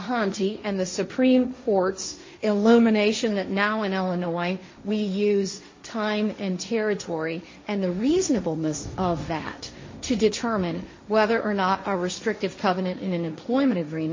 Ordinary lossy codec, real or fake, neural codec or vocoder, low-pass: MP3, 32 kbps; fake; codec, 16 kHz, 1.1 kbps, Voila-Tokenizer; 7.2 kHz